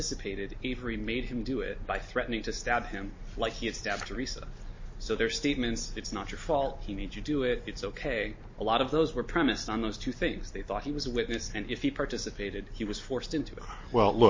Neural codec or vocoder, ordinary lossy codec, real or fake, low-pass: none; MP3, 32 kbps; real; 7.2 kHz